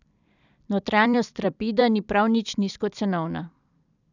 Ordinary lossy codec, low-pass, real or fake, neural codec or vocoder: none; 7.2 kHz; fake; vocoder, 44.1 kHz, 128 mel bands every 256 samples, BigVGAN v2